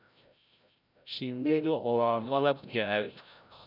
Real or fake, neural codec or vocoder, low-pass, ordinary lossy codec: fake; codec, 16 kHz, 0.5 kbps, FreqCodec, larger model; 5.4 kHz; none